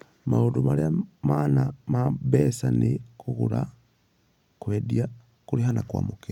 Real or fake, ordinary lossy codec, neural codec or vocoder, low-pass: real; none; none; 19.8 kHz